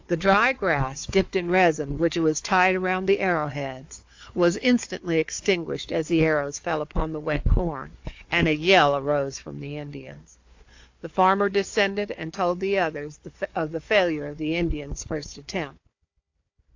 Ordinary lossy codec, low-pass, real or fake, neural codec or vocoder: AAC, 48 kbps; 7.2 kHz; fake; codec, 16 kHz, 4 kbps, FunCodec, trained on Chinese and English, 50 frames a second